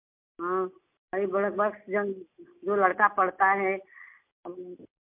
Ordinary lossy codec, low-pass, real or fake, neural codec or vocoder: MP3, 32 kbps; 3.6 kHz; real; none